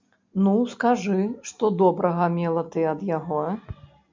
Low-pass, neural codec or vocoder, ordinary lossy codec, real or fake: 7.2 kHz; none; MP3, 48 kbps; real